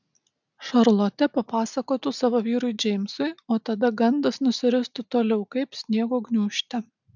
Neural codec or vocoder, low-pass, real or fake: none; 7.2 kHz; real